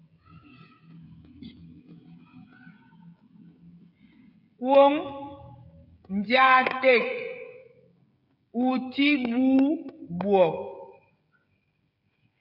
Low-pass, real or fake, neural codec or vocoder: 5.4 kHz; fake; codec, 16 kHz, 16 kbps, FreqCodec, smaller model